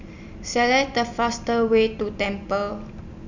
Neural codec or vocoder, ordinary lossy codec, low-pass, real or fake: none; none; 7.2 kHz; real